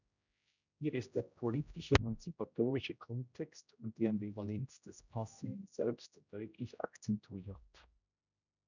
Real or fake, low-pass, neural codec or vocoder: fake; 7.2 kHz; codec, 16 kHz, 0.5 kbps, X-Codec, HuBERT features, trained on general audio